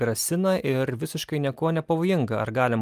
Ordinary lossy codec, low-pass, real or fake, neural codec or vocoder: Opus, 32 kbps; 14.4 kHz; real; none